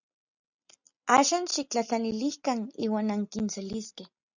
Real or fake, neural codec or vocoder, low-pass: real; none; 7.2 kHz